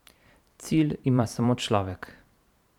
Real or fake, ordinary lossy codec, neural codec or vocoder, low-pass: fake; none; vocoder, 44.1 kHz, 128 mel bands every 512 samples, BigVGAN v2; 19.8 kHz